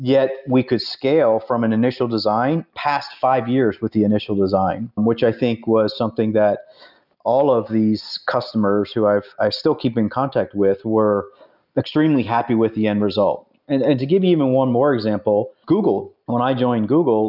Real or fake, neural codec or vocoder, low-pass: real; none; 5.4 kHz